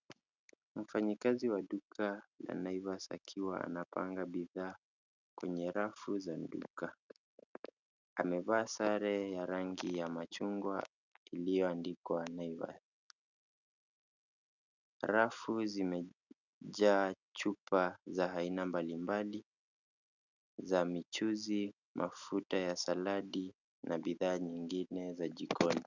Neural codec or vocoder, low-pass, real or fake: none; 7.2 kHz; real